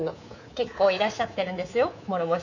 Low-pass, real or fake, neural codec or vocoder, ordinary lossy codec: 7.2 kHz; fake; codec, 24 kHz, 3.1 kbps, DualCodec; none